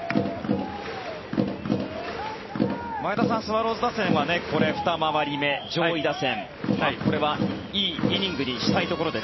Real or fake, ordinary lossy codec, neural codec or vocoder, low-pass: real; MP3, 24 kbps; none; 7.2 kHz